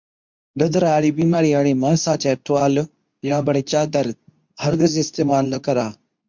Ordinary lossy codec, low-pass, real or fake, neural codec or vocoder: MP3, 64 kbps; 7.2 kHz; fake; codec, 24 kHz, 0.9 kbps, WavTokenizer, medium speech release version 2